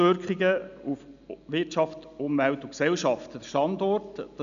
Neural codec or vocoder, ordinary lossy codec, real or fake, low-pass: none; none; real; 7.2 kHz